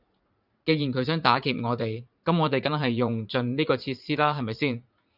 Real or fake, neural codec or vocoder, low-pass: fake; vocoder, 44.1 kHz, 80 mel bands, Vocos; 5.4 kHz